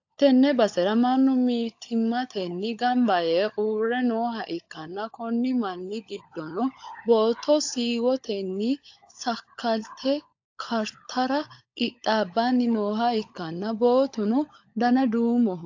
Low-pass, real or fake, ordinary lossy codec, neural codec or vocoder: 7.2 kHz; fake; AAC, 48 kbps; codec, 16 kHz, 16 kbps, FunCodec, trained on LibriTTS, 50 frames a second